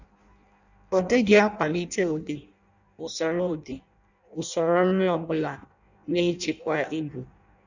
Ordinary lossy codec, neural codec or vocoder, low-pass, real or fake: none; codec, 16 kHz in and 24 kHz out, 0.6 kbps, FireRedTTS-2 codec; 7.2 kHz; fake